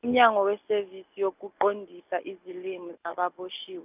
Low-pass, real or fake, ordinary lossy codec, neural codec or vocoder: 3.6 kHz; real; none; none